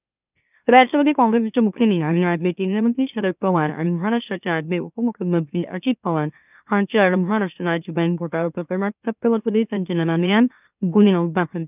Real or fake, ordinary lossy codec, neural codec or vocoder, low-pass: fake; none; autoencoder, 44.1 kHz, a latent of 192 numbers a frame, MeloTTS; 3.6 kHz